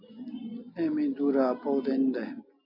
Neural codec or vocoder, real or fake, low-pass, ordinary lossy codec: none; real; 5.4 kHz; AAC, 48 kbps